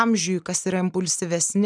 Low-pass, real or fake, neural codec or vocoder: 9.9 kHz; real; none